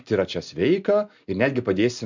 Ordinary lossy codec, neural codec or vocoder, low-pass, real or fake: MP3, 64 kbps; none; 7.2 kHz; real